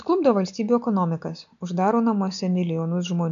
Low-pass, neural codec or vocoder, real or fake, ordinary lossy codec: 7.2 kHz; none; real; AAC, 96 kbps